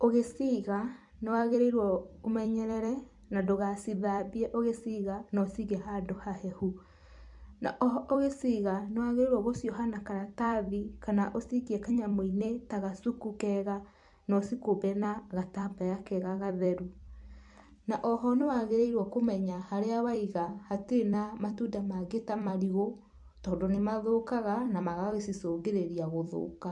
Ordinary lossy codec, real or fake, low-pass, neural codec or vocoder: MP3, 64 kbps; fake; 10.8 kHz; vocoder, 44.1 kHz, 128 mel bands every 256 samples, BigVGAN v2